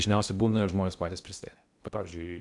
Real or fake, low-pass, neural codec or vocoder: fake; 10.8 kHz; codec, 16 kHz in and 24 kHz out, 0.8 kbps, FocalCodec, streaming, 65536 codes